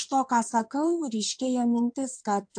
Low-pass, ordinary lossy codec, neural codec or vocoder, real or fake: 9.9 kHz; AAC, 64 kbps; codec, 16 kHz in and 24 kHz out, 2.2 kbps, FireRedTTS-2 codec; fake